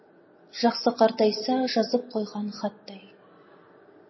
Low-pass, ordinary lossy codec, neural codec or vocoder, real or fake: 7.2 kHz; MP3, 24 kbps; none; real